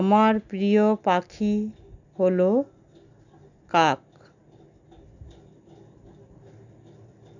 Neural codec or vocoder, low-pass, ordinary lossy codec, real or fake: none; 7.2 kHz; none; real